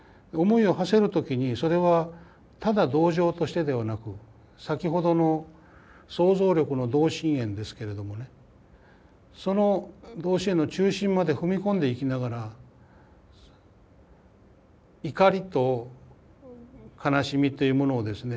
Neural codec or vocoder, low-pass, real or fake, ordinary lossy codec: none; none; real; none